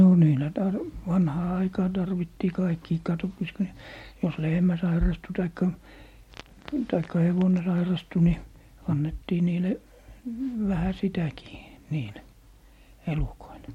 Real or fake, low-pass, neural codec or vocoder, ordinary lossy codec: real; 19.8 kHz; none; MP3, 64 kbps